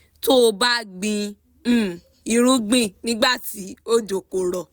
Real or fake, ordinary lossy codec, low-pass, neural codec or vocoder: real; none; none; none